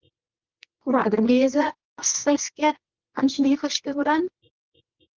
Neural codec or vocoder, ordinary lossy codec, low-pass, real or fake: codec, 24 kHz, 0.9 kbps, WavTokenizer, medium music audio release; Opus, 24 kbps; 7.2 kHz; fake